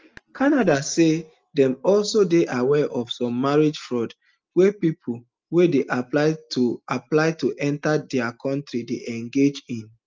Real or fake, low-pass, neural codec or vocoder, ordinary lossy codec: real; 7.2 kHz; none; Opus, 24 kbps